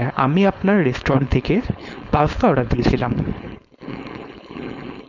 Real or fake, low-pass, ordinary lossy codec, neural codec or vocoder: fake; 7.2 kHz; none; codec, 16 kHz, 4.8 kbps, FACodec